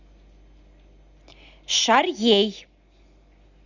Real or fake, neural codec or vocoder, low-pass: real; none; 7.2 kHz